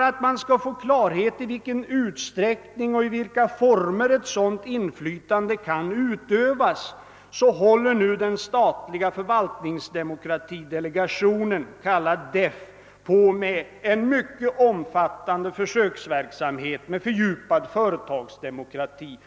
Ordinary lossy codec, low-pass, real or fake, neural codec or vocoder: none; none; real; none